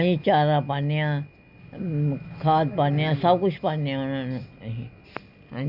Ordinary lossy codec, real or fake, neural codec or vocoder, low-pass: none; real; none; 5.4 kHz